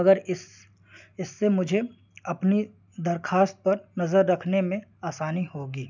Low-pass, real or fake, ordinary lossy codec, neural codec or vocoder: 7.2 kHz; real; none; none